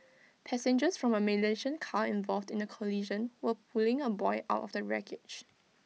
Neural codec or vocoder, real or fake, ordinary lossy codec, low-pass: none; real; none; none